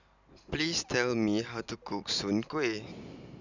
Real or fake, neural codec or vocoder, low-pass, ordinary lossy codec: real; none; 7.2 kHz; none